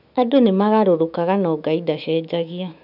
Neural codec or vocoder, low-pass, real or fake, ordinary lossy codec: autoencoder, 48 kHz, 128 numbers a frame, DAC-VAE, trained on Japanese speech; 5.4 kHz; fake; none